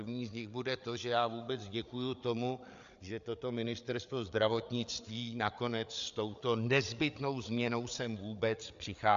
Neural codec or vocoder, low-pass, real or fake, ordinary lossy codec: codec, 16 kHz, 8 kbps, FreqCodec, larger model; 7.2 kHz; fake; MP3, 64 kbps